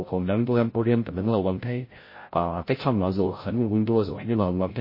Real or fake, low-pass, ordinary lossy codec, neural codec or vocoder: fake; 5.4 kHz; MP3, 24 kbps; codec, 16 kHz, 0.5 kbps, FreqCodec, larger model